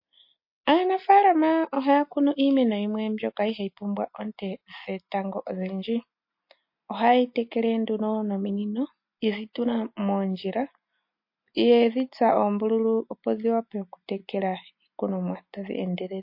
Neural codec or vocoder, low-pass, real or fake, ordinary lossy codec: none; 5.4 kHz; real; MP3, 32 kbps